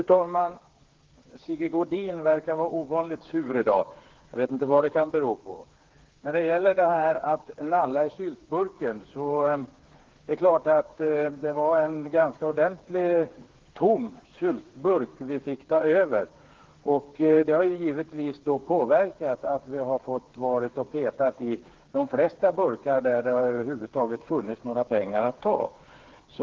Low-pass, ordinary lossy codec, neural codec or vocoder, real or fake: 7.2 kHz; Opus, 16 kbps; codec, 16 kHz, 4 kbps, FreqCodec, smaller model; fake